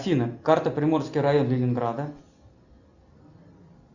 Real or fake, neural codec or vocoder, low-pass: real; none; 7.2 kHz